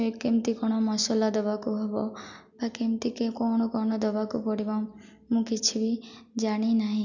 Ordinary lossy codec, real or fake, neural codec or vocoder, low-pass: none; real; none; 7.2 kHz